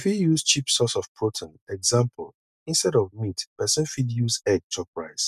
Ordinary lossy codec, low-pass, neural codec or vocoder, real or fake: none; 14.4 kHz; none; real